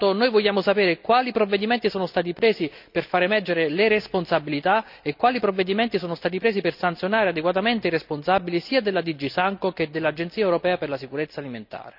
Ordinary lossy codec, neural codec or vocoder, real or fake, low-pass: none; none; real; 5.4 kHz